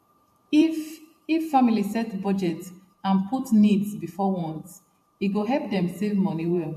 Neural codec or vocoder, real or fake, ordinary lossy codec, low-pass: vocoder, 44.1 kHz, 128 mel bands every 512 samples, BigVGAN v2; fake; MP3, 64 kbps; 14.4 kHz